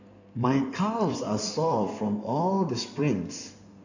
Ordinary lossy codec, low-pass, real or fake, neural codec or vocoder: none; 7.2 kHz; fake; codec, 16 kHz in and 24 kHz out, 2.2 kbps, FireRedTTS-2 codec